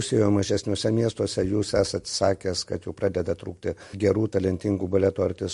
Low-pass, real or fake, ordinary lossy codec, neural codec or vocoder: 14.4 kHz; real; MP3, 48 kbps; none